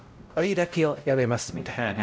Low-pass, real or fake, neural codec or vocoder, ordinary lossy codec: none; fake; codec, 16 kHz, 0.5 kbps, X-Codec, WavLM features, trained on Multilingual LibriSpeech; none